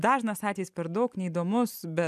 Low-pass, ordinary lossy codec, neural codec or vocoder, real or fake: 14.4 kHz; MP3, 96 kbps; none; real